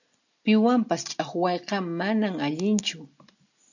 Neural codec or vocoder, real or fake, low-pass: none; real; 7.2 kHz